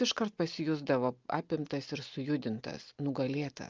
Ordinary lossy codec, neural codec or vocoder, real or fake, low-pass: Opus, 24 kbps; none; real; 7.2 kHz